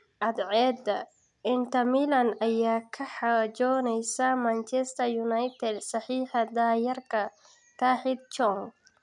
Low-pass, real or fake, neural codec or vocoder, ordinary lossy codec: 10.8 kHz; real; none; none